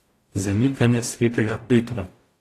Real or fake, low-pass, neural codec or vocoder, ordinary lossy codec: fake; 14.4 kHz; codec, 44.1 kHz, 0.9 kbps, DAC; AAC, 48 kbps